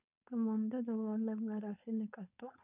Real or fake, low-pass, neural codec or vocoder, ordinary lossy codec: fake; 3.6 kHz; codec, 16 kHz, 4.8 kbps, FACodec; none